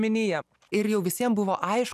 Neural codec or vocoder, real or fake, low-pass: none; real; 14.4 kHz